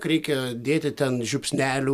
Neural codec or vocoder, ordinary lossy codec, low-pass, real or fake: none; MP3, 96 kbps; 14.4 kHz; real